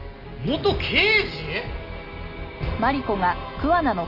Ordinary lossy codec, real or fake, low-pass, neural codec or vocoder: none; real; 5.4 kHz; none